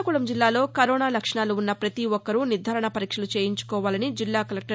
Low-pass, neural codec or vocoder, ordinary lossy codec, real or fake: none; none; none; real